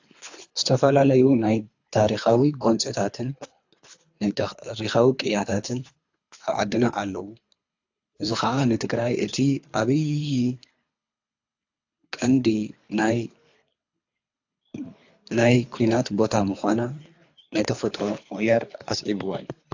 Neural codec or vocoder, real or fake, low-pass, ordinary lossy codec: codec, 24 kHz, 3 kbps, HILCodec; fake; 7.2 kHz; AAC, 48 kbps